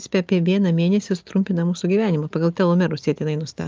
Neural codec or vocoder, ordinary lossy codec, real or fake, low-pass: none; Opus, 24 kbps; real; 7.2 kHz